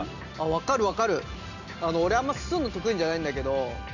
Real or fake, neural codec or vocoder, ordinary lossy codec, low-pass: real; none; none; 7.2 kHz